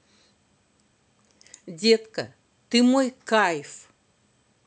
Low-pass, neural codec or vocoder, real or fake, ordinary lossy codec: none; none; real; none